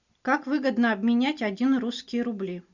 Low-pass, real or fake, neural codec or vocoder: 7.2 kHz; real; none